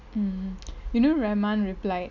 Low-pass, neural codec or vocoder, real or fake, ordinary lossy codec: 7.2 kHz; none; real; none